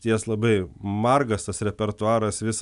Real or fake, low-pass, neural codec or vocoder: real; 10.8 kHz; none